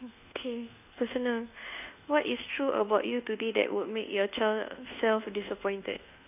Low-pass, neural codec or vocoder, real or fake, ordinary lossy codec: 3.6 kHz; codec, 24 kHz, 1.2 kbps, DualCodec; fake; none